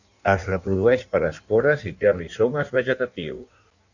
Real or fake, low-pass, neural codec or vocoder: fake; 7.2 kHz; codec, 16 kHz in and 24 kHz out, 1.1 kbps, FireRedTTS-2 codec